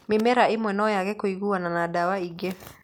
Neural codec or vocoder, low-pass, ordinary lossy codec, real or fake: none; none; none; real